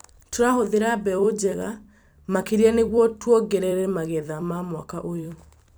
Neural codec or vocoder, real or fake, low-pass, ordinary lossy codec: vocoder, 44.1 kHz, 128 mel bands every 256 samples, BigVGAN v2; fake; none; none